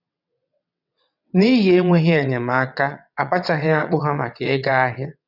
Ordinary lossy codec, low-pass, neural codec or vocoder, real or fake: none; 5.4 kHz; vocoder, 22.05 kHz, 80 mel bands, WaveNeXt; fake